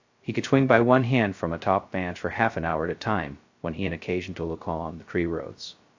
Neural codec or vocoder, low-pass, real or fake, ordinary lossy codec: codec, 16 kHz, 0.2 kbps, FocalCodec; 7.2 kHz; fake; AAC, 48 kbps